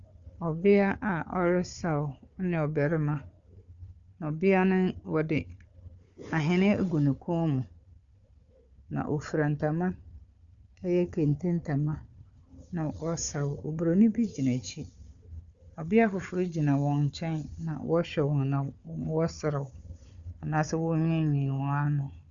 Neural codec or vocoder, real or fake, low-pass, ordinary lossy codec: codec, 16 kHz, 4 kbps, FunCodec, trained on Chinese and English, 50 frames a second; fake; 7.2 kHz; Opus, 64 kbps